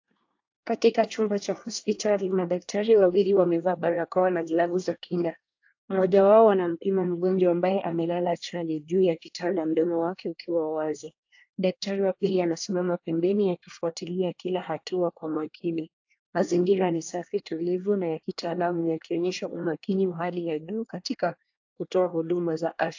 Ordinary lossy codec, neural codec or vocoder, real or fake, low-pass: AAC, 48 kbps; codec, 24 kHz, 1 kbps, SNAC; fake; 7.2 kHz